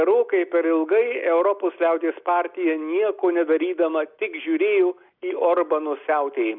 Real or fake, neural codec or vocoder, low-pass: real; none; 5.4 kHz